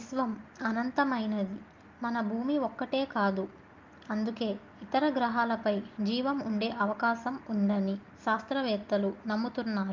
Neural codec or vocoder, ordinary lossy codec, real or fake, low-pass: none; Opus, 32 kbps; real; 7.2 kHz